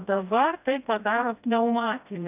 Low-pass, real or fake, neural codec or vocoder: 3.6 kHz; fake; codec, 16 kHz, 1 kbps, FreqCodec, smaller model